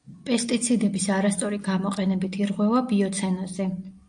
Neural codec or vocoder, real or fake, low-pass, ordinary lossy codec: none; real; 9.9 kHz; AAC, 64 kbps